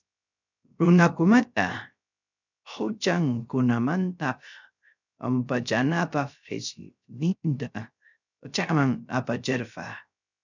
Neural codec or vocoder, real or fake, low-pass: codec, 16 kHz, 0.3 kbps, FocalCodec; fake; 7.2 kHz